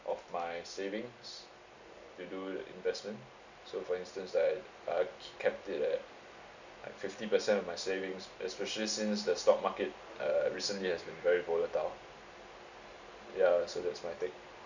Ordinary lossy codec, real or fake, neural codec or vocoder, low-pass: none; real; none; 7.2 kHz